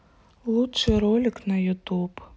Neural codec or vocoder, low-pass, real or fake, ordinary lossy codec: none; none; real; none